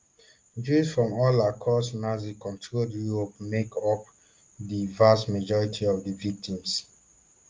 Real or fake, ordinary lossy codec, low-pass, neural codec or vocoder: real; Opus, 24 kbps; 7.2 kHz; none